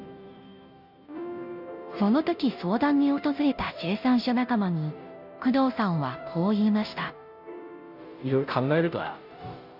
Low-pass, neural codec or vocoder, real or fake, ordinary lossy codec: 5.4 kHz; codec, 16 kHz, 0.5 kbps, FunCodec, trained on Chinese and English, 25 frames a second; fake; none